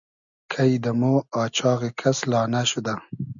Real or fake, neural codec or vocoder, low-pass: real; none; 7.2 kHz